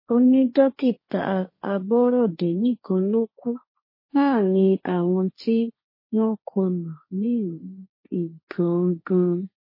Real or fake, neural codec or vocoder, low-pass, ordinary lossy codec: fake; codec, 16 kHz, 1.1 kbps, Voila-Tokenizer; 5.4 kHz; MP3, 24 kbps